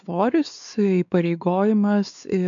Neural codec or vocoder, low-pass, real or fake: codec, 16 kHz, 4 kbps, X-Codec, WavLM features, trained on Multilingual LibriSpeech; 7.2 kHz; fake